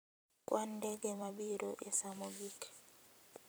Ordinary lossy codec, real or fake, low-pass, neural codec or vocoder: none; fake; none; vocoder, 44.1 kHz, 128 mel bands every 256 samples, BigVGAN v2